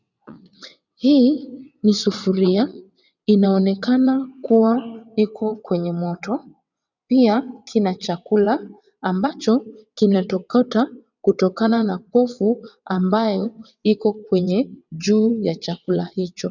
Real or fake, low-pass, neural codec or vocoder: fake; 7.2 kHz; vocoder, 22.05 kHz, 80 mel bands, WaveNeXt